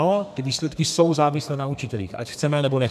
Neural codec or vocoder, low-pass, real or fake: codec, 32 kHz, 1.9 kbps, SNAC; 14.4 kHz; fake